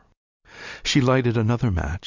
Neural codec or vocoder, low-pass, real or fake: none; 7.2 kHz; real